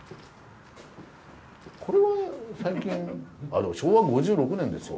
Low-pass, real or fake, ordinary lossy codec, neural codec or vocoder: none; real; none; none